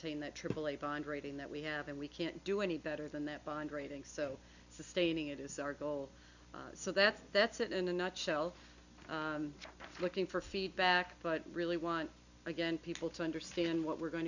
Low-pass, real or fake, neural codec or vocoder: 7.2 kHz; real; none